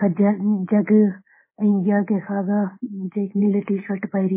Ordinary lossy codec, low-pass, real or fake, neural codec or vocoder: MP3, 16 kbps; 3.6 kHz; fake; codec, 24 kHz, 3.1 kbps, DualCodec